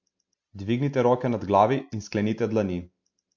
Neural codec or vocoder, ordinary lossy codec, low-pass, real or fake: none; MP3, 48 kbps; 7.2 kHz; real